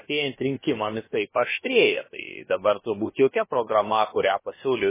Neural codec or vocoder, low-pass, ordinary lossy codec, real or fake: codec, 16 kHz, about 1 kbps, DyCAST, with the encoder's durations; 3.6 kHz; MP3, 16 kbps; fake